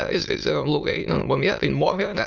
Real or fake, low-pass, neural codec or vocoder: fake; 7.2 kHz; autoencoder, 22.05 kHz, a latent of 192 numbers a frame, VITS, trained on many speakers